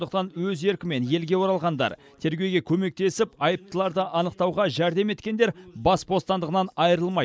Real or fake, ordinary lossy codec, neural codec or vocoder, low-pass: real; none; none; none